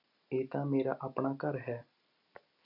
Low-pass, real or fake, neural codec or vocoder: 5.4 kHz; real; none